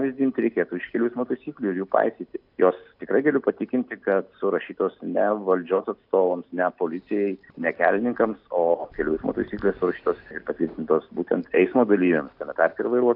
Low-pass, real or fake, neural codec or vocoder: 5.4 kHz; real; none